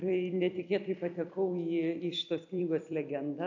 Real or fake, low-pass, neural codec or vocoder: fake; 7.2 kHz; vocoder, 44.1 kHz, 128 mel bands every 256 samples, BigVGAN v2